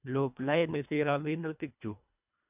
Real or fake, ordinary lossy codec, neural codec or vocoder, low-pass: fake; none; codec, 24 kHz, 1.5 kbps, HILCodec; 3.6 kHz